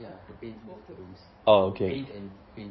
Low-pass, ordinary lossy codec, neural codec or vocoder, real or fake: 7.2 kHz; MP3, 24 kbps; codec, 16 kHz in and 24 kHz out, 2.2 kbps, FireRedTTS-2 codec; fake